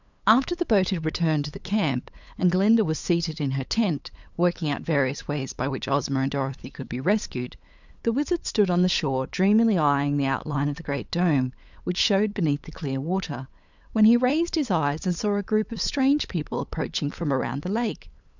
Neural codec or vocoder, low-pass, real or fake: codec, 16 kHz, 8 kbps, FunCodec, trained on LibriTTS, 25 frames a second; 7.2 kHz; fake